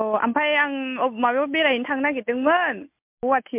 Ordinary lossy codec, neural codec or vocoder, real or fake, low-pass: MP3, 32 kbps; none; real; 3.6 kHz